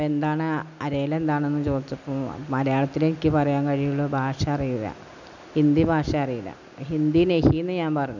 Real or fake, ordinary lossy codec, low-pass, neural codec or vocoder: real; none; 7.2 kHz; none